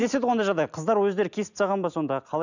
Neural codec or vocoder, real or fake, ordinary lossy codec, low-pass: none; real; none; 7.2 kHz